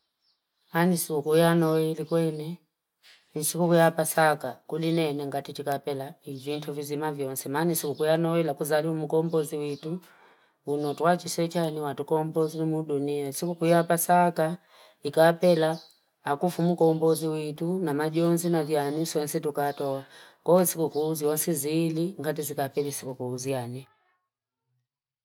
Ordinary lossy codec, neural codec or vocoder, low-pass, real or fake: none; none; 19.8 kHz; real